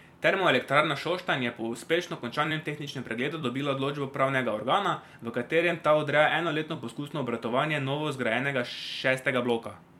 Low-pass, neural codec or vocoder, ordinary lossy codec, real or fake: 19.8 kHz; vocoder, 44.1 kHz, 128 mel bands every 256 samples, BigVGAN v2; MP3, 96 kbps; fake